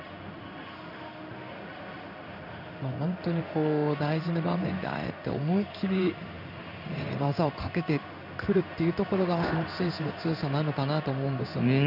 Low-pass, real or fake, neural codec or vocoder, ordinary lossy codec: 5.4 kHz; fake; codec, 16 kHz in and 24 kHz out, 1 kbps, XY-Tokenizer; none